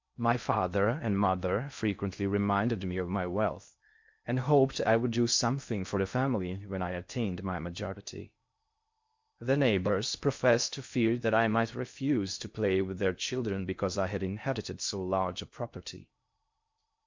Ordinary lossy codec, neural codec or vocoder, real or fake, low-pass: MP3, 64 kbps; codec, 16 kHz in and 24 kHz out, 0.6 kbps, FocalCodec, streaming, 2048 codes; fake; 7.2 kHz